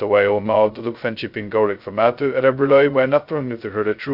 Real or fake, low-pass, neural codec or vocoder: fake; 5.4 kHz; codec, 16 kHz, 0.2 kbps, FocalCodec